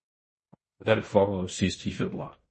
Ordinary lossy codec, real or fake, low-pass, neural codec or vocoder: MP3, 32 kbps; fake; 10.8 kHz; codec, 16 kHz in and 24 kHz out, 0.9 kbps, LongCat-Audio-Codec, fine tuned four codebook decoder